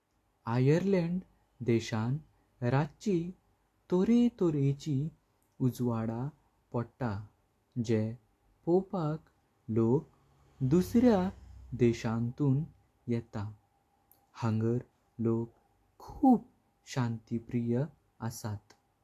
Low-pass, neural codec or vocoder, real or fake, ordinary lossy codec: 14.4 kHz; none; real; AAC, 64 kbps